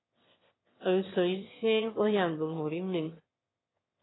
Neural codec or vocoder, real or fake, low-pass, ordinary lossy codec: autoencoder, 22.05 kHz, a latent of 192 numbers a frame, VITS, trained on one speaker; fake; 7.2 kHz; AAC, 16 kbps